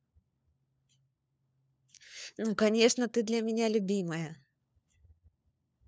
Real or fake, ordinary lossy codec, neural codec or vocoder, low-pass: fake; none; codec, 16 kHz, 4 kbps, FunCodec, trained on LibriTTS, 50 frames a second; none